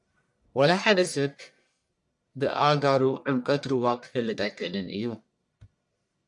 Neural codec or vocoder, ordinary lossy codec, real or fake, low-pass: codec, 44.1 kHz, 1.7 kbps, Pupu-Codec; MP3, 64 kbps; fake; 10.8 kHz